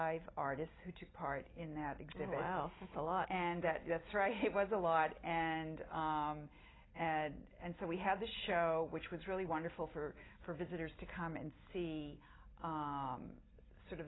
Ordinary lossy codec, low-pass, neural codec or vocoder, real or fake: AAC, 16 kbps; 7.2 kHz; none; real